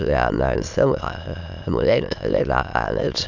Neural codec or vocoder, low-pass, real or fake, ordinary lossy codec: autoencoder, 22.05 kHz, a latent of 192 numbers a frame, VITS, trained on many speakers; 7.2 kHz; fake; none